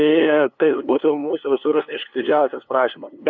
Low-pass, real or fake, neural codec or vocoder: 7.2 kHz; fake; codec, 16 kHz, 2 kbps, FunCodec, trained on LibriTTS, 25 frames a second